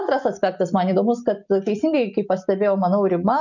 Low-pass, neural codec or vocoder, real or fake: 7.2 kHz; none; real